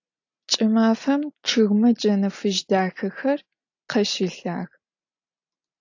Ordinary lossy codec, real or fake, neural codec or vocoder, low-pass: AAC, 48 kbps; real; none; 7.2 kHz